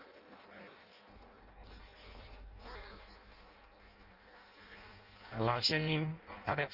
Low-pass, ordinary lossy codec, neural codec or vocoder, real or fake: 5.4 kHz; Opus, 32 kbps; codec, 16 kHz in and 24 kHz out, 0.6 kbps, FireRedTTS-2 codec; fake